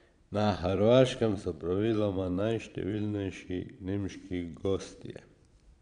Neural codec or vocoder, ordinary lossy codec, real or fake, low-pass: vocoder, 22.05 kHz, 80 mel bands, Vocos; none; fake; 9.9 kHz